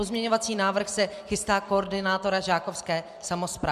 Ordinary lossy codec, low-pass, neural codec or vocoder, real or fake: MP3, 96 kbps; 14.4 kHz; vocoder, 44.1 kHz, 128 mel bands every 256 samples, BigVGAN v2; fake